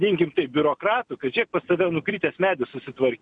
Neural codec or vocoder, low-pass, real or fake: none; 9.9 kHz; real